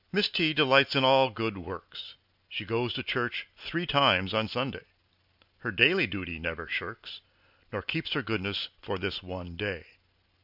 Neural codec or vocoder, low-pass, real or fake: none; 5.4 kHz; real